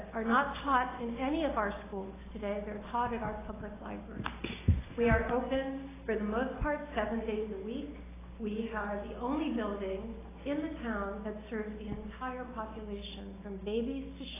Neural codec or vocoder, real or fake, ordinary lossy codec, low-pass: none; real; AAC, 16 kbps; 3.6 kHz